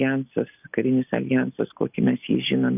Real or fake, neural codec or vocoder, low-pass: real; none; 3.6 kHz